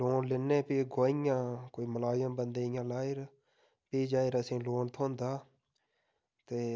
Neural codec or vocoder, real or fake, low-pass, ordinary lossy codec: none; real; none; none